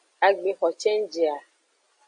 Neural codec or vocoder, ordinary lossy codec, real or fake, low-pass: none; MP3, 48 kbps; real; 9.9 kHz